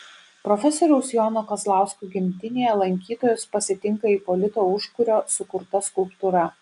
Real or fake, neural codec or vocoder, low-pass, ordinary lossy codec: real; none; 10.8 kHz; AAC, 96 kbps